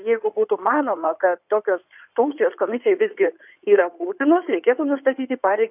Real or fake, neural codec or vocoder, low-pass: fake; codec, 16 kHz in and 24 kHz out, 2.2 kbps, FireRedTTS-2 codec; 3.6 kHz